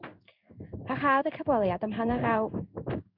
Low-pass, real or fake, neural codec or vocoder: 5.4 kHz; fake; codec, 16 kHz in and 24 kHz out, 1 kbps, XY-Tokenizer